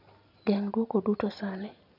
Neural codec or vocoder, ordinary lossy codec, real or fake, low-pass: none; none; real; 5.4 kHz